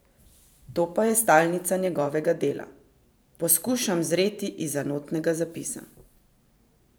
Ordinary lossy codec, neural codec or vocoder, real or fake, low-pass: none; vocoder, 44.1 kHz, 128 mel bands every 512 samples, BigVGAN v2; fake; none